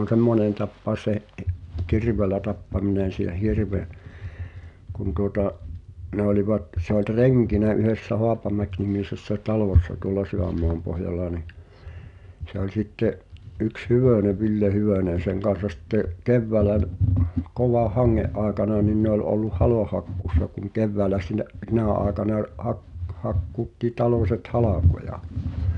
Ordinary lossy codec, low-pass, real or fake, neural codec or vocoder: none; 10.8 kHz; real; none